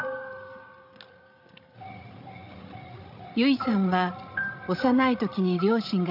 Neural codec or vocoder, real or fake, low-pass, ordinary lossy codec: codec, 16 kHz, 16 kbps, FreqCodec, larger model; fake; 5.4 kHz; AAC, 48 kbps